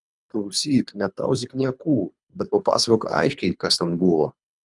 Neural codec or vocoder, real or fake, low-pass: codec, 24 kHz, 3 kbps, HILCodec; fake; 10.8 kHz